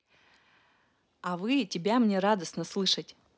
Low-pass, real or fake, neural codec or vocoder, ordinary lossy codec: none; real; none; none